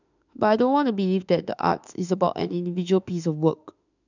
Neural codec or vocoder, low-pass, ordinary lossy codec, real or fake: autoencoder, 48 kHz, 32 numbers a frame, DAC-VAE, trained on Japanese speech; 7.2 kHz; none; fake